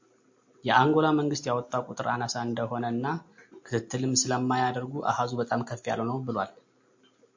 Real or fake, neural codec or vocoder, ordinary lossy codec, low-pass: real; none; MP3, 48 kbps; 7.2 kHz